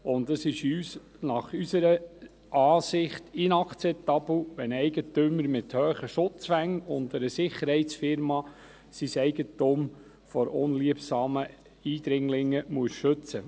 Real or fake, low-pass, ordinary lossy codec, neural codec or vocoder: real; none; none; none